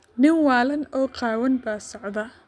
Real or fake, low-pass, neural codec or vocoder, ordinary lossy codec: real; 9.9 kHz; none; none